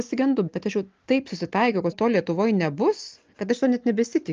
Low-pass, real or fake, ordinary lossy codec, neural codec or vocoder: 7.2 kHz; real; Opus, 24 kbps; none